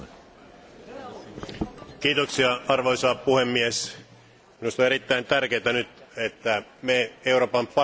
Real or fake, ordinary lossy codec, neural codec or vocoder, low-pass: real; none; none; none